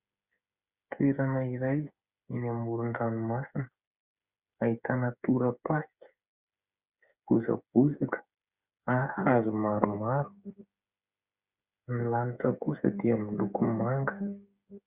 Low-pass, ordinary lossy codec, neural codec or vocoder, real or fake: 3.6 kHz; Opus, 64 kbps; codec, 16 kHz, 8 kbps, FreqCodec, smaller model; fake